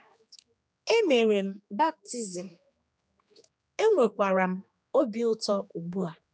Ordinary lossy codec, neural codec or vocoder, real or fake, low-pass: none; codec, 16 kHz, 2 kbps, X-Codec, HuBERT features, trained on general audio; fake; none